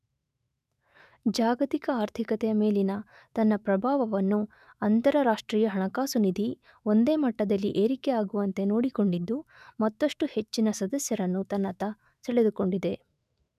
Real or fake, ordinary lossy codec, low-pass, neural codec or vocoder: fake; none; 14.4 kHz; autoencoder, 48 kHz, 128 numbers a frame, DAC-VAE, trained on Japanese speech